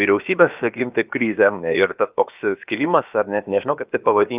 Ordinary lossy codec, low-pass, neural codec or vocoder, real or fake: Opus, 24 kbps; 3.6 kHz; codec, 16 kHz, about 1 kbps, DyCAST, with the encoder's durations; fake